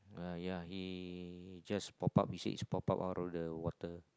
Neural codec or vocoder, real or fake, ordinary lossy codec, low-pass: none; real; none; none